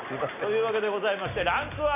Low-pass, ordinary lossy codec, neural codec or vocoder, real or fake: 3.6 kHz; none; none; real